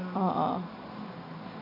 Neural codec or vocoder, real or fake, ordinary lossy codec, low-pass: none; real; AAC, 48 kbps; 5.4 kHz